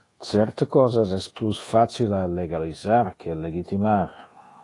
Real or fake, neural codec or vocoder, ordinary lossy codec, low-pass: fake; codec, 24 kHz, 1.2 kbps, DualCodec; AAC, 32 kbps; 10.8 kHz